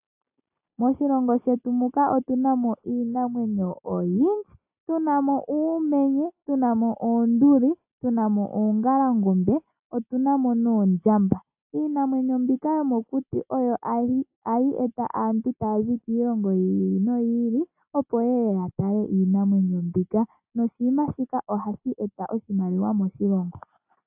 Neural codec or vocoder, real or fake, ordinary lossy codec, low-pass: none; real; AAC, 32 kbps; 3.6 kHz